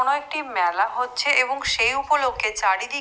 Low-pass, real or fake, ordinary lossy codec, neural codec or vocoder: none; real; none; none